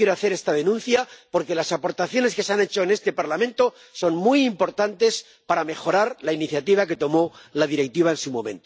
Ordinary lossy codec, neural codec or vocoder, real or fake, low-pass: none; none; real; none